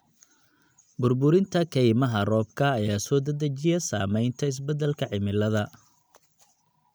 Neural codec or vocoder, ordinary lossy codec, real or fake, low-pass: none; none; real; none